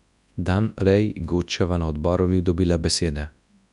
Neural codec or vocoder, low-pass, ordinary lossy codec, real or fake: codec, 24 kHz, 0.9 kbps, WavTokenizer, large speech release; 10.8 kHz; none; fake